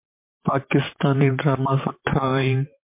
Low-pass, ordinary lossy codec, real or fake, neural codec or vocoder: 3.6 kHz; MP3, 24 kbps; fake; vocoder, 44.1 kHz, 128 mel bands, Pupu-Vocoder